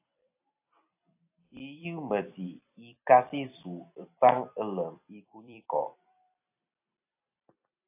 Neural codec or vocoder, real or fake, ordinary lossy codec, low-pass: vocoder, 44.1 kHz, 128 mel bands every 256 samples, BigVGAN v2; fake; AAC, 24 kbps; 3.6 kHz